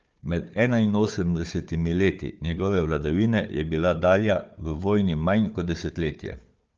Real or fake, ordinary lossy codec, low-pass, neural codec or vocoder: fake; Opus, 24 kbps; 7.2 kHz; codec, 16 kHz, 4 kbps, FunCodec, trained on Chinese and English, 50 frames a second